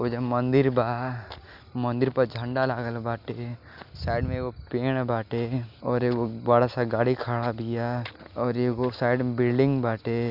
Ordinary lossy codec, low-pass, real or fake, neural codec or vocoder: Opus, 64 kbps; 5.4 kHz; real; none